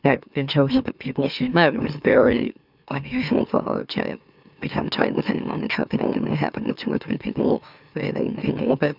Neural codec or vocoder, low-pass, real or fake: autoencoder, 44.1 kHz, a latent of 192 numbers a frame, MeloTTS; 5.4 kHz; fake